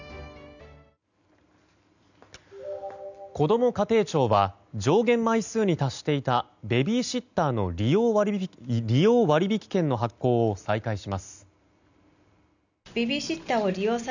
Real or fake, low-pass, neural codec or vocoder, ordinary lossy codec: real; 7.2 kHz; none; none